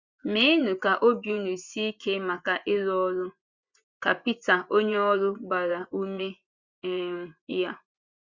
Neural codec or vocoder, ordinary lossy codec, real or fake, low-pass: vocoder, 24 kHz, 100 mel bands, Vocos; Opus, 64 kbps; fake; 7.2 kHz